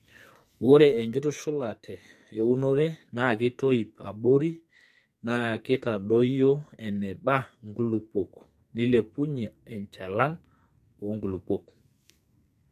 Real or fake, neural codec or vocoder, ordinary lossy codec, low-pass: fake; codec, 44.1 kHz, 2.6 kbps, SNAC; MP3, 64 kbps; 14.4 kHz